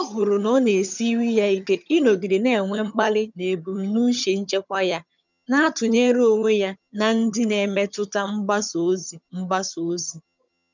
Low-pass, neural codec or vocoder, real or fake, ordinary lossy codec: 7.2 kHz; vocoder, 22.05 kHz, 80 mel bands, HiFi-GAN; fake; none